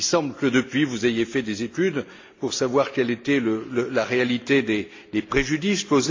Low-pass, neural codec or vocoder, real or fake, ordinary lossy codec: 7.2 kHz; none; real; AAC, 48 kbps